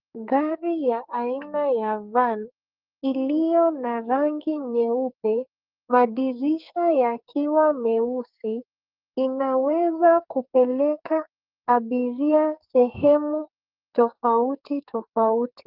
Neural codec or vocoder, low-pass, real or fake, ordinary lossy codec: codec, 44.1 kHz, 2.6 kbps, SNAC; 5.4 kHz; fake; Opus, 24 kbps